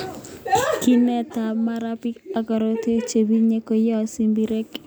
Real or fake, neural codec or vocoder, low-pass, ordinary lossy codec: real; none; none; none